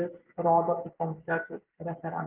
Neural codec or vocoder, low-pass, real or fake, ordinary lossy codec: none; 3.6 kHz; real; Opus, 32 kbps